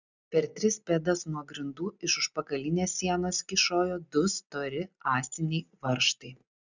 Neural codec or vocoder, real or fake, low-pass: none; real; 7.2 kHz